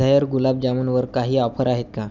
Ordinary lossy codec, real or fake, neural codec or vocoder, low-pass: none; real; none; 7.2 kHz